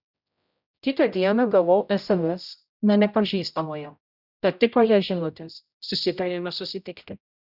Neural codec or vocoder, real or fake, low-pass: codec, 16 kHz, 0.5 kbps, X-Codec, HuBERT features, trained on general audio; fake; 5.4 kHz